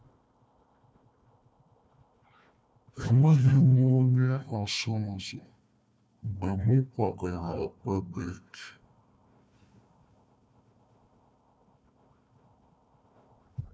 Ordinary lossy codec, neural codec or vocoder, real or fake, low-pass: none; codec, 16 kHz, 1 kbps, FunCodec, trained on Chinese and English, 50 frames a second; fake; none